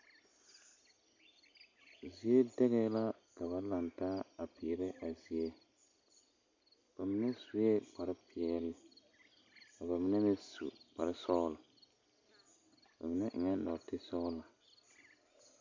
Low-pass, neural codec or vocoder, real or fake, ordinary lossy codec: 7.2 kHz; none; real; MP3, 64 kbps